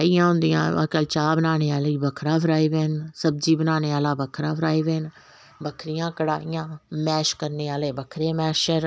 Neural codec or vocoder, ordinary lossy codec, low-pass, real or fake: none; none; none; real